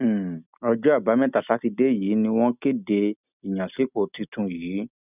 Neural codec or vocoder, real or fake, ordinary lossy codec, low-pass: none; real; none; 3.6 kHz